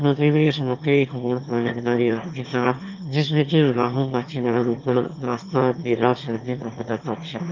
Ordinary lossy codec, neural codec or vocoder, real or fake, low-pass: Opus, 24 kbps; autoencoder, 22.05 kHz, a latent of 192 numbers a frame, VITS, trained on one speaker; fake; 7.2 kHz